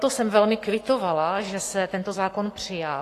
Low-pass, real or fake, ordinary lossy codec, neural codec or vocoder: 14.4 kHz; fake; AAC, 48 kbps; codec, 44.1 kHz, 7.8 kbps, Pupu-Codec